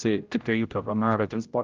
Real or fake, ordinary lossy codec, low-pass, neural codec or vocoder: fake; Opus, 24 kbps; 7.2 kHz; codec, 16 kHz, 0.5 kbps, X-Codec, HuBERT features, trained on general audio